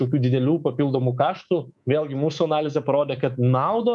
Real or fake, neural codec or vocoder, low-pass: fake; codec, 24 kHz, 3.1 kbps, DualCodec; 10.8 kHz